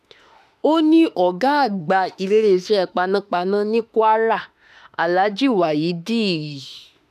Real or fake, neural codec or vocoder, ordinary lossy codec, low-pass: fake; autoencoder, 48 kHz, 32 numbers a frame, DAC-VAE, trained on Japanese speech; none; 14.4 kHz